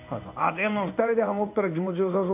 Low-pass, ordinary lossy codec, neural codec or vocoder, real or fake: 3.6 kHz; none; codec, 16 kHz in and 24 kHz out, 1 kbps, XY-Tokenizer; fake